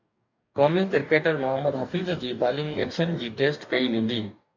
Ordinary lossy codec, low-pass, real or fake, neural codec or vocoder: MP3, 64 kbps; 7.2 kHz; fake; codec, 44.1 kHz, 2.6 kbps, DAC